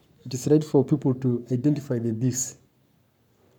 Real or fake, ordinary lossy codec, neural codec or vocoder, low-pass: fake; none; codec, 44.1 kHz, 7.8 kbps, Pupu-Codec; 19.8 kHz